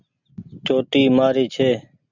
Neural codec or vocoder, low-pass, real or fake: none; 7.2 kHz; real